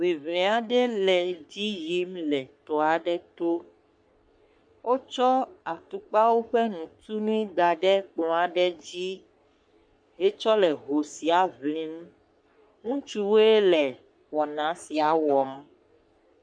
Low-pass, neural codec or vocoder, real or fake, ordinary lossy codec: 9.9 kHz; codec, 44.1 kHz, 3.4 kbps, Pupu-Codec; fake; MP3, 64 kbps